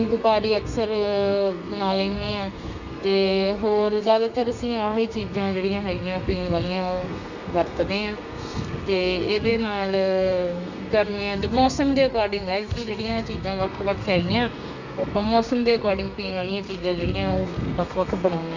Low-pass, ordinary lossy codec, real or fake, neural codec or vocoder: 7.2 kHz; none; fake; codec, 32 kHz, 1.9 kbps, SNAC